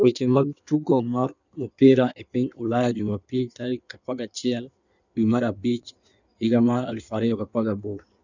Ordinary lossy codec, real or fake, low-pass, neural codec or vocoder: none; fake; 7.2 kHz; codec, 16 kHz in and 24 kHz out, 1.1 kbps, FireRedTTS-2 codec